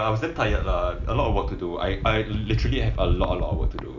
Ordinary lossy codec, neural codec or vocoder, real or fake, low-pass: none; none; real; 7.2 kHz